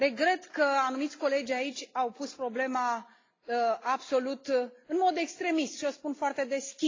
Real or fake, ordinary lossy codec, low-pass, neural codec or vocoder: real; AAC, 32 kbps; 7.2 kHz; none